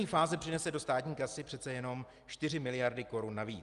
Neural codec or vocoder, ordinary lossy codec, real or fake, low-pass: none; Opus, 32 kbps; real; 10.8 kHz